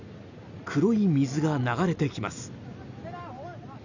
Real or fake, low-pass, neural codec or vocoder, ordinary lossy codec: real; 7.2 kHz; none; none